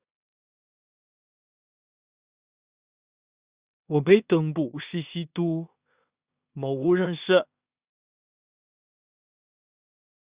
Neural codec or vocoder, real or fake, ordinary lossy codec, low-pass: codec, 16 kHz in and 24 kHz out, 0.4 kbps, LongCat-Audio-Codec, two codebook decoder; fake; Opus, 64 kbps; 3.6 kHz